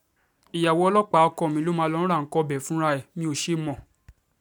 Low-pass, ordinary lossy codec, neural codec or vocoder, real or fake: none; none; none; real